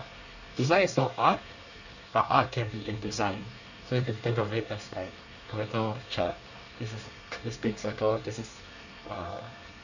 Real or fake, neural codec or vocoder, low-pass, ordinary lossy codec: fake; codec, 24 kHz, 1 kbps, SNAC; 7.2 kHz; none